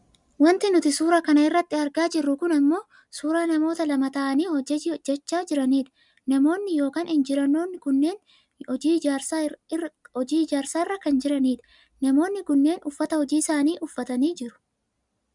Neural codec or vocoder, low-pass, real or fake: none; 10.8 kHz; real